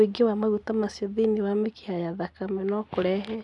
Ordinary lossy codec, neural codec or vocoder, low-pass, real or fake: none; none; 10.8 kHz; real